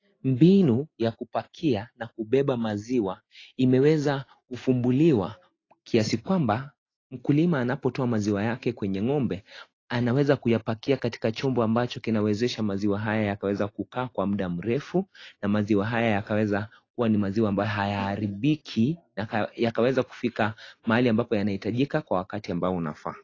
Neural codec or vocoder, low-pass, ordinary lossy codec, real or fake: none; 7.2 kHz; AAC, 32 kbps; real